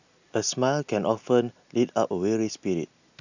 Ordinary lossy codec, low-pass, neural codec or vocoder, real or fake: none; 7.2 kHz; none; real